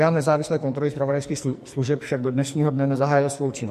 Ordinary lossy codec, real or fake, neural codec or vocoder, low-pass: MP3, 64 kbps; fake; codec, 44.1 kHz, 2.6 kbps, SNAC; 14.4 kHz